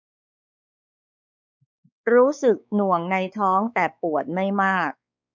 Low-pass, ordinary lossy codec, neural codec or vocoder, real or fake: none; none; codec, 16 kHz, 4 kbps, X-Codec, WavLM features, trained on Multilingual LibriSpeech; fake